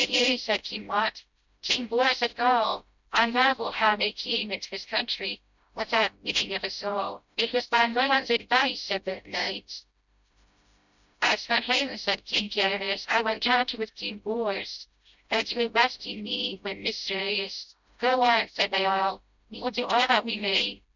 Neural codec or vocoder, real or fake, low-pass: codec, 16 kHz, 0.5 kbps, FreqCodec, smaller model; fake; 7.2 kHz